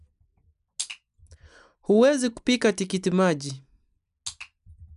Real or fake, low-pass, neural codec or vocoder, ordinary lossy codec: real; 10.8 kHz; none; none